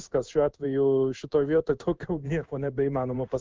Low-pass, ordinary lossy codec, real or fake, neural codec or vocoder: 7.2 kHz; Opus, 24 kbps; fake; codec, 16 kHz in and 24 kHz out, 1 kbps, XY-Tokenizer